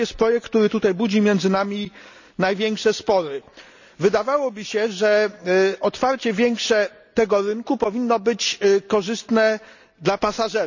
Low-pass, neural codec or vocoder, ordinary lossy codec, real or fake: 7.2 kHz; none; none; real